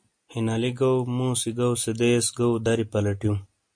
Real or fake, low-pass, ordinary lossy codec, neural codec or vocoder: real; 9.9 kHz; MP3, 48 kbps; none